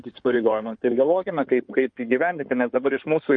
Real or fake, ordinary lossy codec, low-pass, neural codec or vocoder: fake; MP3, 48 kbps; 9.9 kHz; codec, 16 kHz in and 24 kHz out, 2.2 kbps, FireRedTTS-2 codec